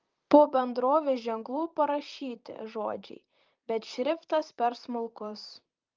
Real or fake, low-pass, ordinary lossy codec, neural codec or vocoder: fake; 7.2 kHz; Opus, 24 kbps; vocoder, 44.1 kHz, 128 mel bands, Pupu-Vocoder